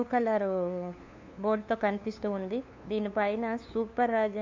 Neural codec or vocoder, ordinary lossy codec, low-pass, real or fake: codec, 16 kHz, 2 kbps, FunCodec, trained on LibriTTS, 25 frames a second; MP3, 64 kbps; 7.2 kHz; fake